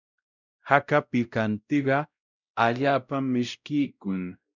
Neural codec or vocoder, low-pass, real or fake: codec, 16 kHz, 0.5 kbps, X-Codec, WavLM features, trained on Multilingual LibriSpeech; 7.2 kHz; fake